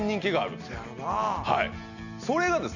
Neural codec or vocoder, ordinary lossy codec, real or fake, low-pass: none; none; real; 7.2 kHz